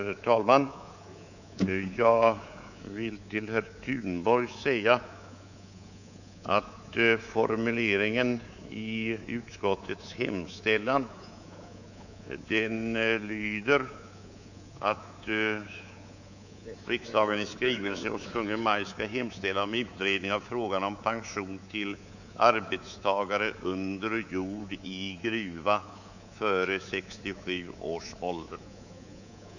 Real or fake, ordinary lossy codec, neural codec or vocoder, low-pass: fake; none; codec, 24 kHz, 3.1 kbps, DualCodec; 7.2 kHz